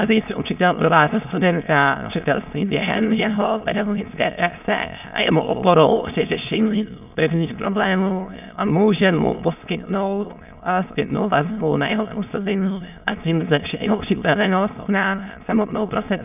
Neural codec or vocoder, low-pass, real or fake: autoencoder, 22.05 kHz, a latent of 192 numbers a frame, VITS, trained on many speakers; 3.6 kHz; fake